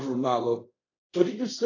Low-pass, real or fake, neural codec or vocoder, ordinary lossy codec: 7.2 kHz; fake; codec, 24 kHz, 0.5 kbps, DualCodec; AAC, 32 kbps